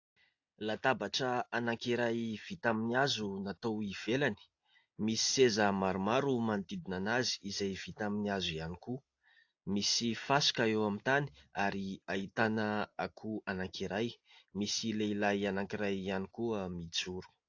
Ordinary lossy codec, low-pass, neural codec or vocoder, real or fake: AAC, 48 kbps; 7.2 kHz; none; real